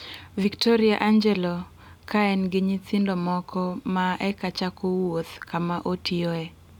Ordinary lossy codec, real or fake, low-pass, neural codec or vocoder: none; real; 19.8 kHz; none